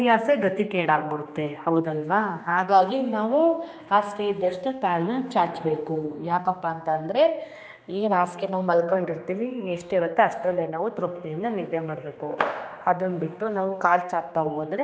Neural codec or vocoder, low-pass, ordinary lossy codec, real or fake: codec, 16 kHz, 2 kbps, X-Codec, HuBERT features, trained on general audio; none; none; fake